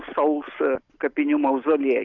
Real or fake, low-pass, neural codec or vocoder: real; 7.2 kHz; none